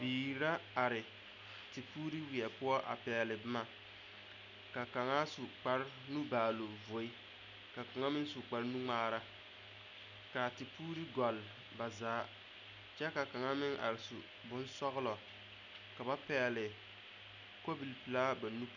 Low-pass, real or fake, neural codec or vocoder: 7.2 kHz; real; none